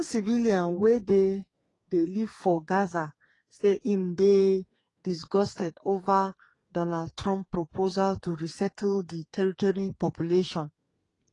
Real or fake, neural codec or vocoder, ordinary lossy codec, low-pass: fake; codec, 32 kHz, 1.9 kbps, SNAC; AAC, 32 kbps; 10.8 kHz